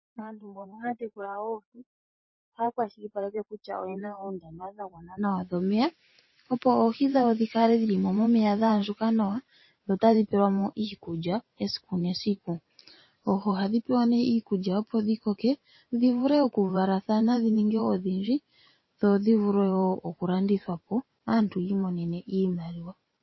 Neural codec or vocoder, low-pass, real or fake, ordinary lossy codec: vocoder, 44.1 kHz, 128 mel bands every 512 samples, BigVGAN v2; 7.2 kHz; fake; MP3, 24 kbps